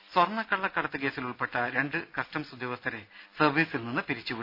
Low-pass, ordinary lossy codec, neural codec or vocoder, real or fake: 5.4 kHz; none; none; real